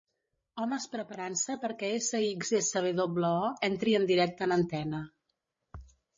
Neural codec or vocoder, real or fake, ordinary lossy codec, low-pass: codec, 16 kHz, 16 kbps, FreqCodec, larger model; fake; MP3, 32 kbps; 7.2 kHz